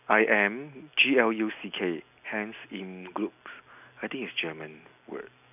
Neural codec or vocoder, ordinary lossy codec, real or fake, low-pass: none; none; real; 3.6 kHz